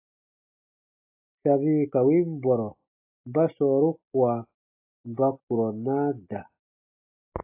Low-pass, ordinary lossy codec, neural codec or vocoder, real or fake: 3.6 kHz; AAC, 32 kbps; none; real